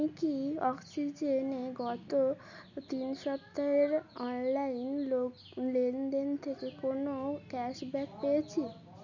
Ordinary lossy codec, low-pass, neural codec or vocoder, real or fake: none; 7.2 kHz; none; real